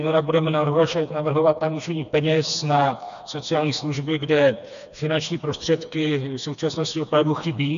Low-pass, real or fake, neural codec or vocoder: 7.2 kHz; fake; codec, 16 kHz, 2 kbps, FreqCodec, smaller model